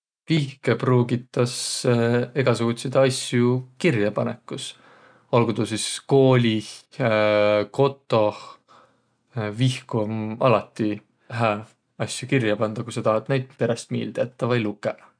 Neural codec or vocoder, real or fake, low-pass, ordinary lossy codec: none; real; 9.9 kHz; none